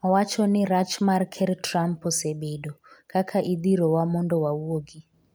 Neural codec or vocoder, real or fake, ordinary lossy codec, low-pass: none; real; none; none